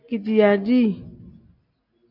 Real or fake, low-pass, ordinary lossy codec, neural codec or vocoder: real; 5.4 kHz; AAC, 48 kbps; none